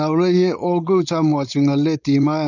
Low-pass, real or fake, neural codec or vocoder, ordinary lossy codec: 7.2 kHz; fake; codec, 16 kHz, 4.8 kbps, FACodec; none